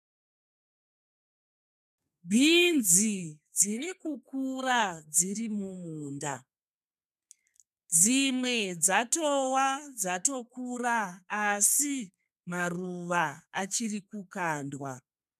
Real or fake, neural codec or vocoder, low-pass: fake; codec, 32 kHz, 1.9 kbps, SNAC; 14.4 kHz